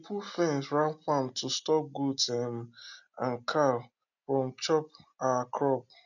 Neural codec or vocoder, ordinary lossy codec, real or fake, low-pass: none; none; real; 7.2 kHz